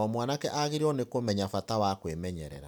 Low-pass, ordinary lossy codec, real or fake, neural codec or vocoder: none; none; real; none